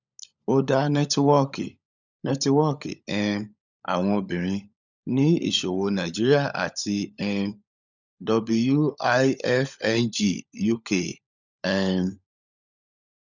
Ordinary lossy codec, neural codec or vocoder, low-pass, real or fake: none; codec, 16 kHz, 16 kbps, FunCodec, trained on LibriTTS, 50 frames a second; 7.2 kHz; fake